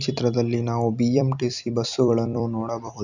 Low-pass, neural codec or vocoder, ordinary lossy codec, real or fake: 7.2 kHz; vocoder, 44.1 kHz, 128 mel bands every 256 samples, BigVGAN v2; none; fake